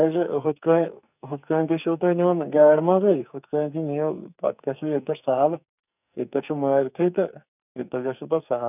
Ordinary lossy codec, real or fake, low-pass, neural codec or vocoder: none; fake; 3.6 kHz; codec, 44.1 kHz, 2.6 kbps, SNAC